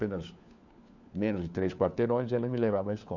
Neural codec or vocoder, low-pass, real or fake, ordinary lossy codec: codec, 16 kHz, 4 kbps, FunCodec, trained on LibriTTS, 50 frames a second; 7.2 kHz; fake; none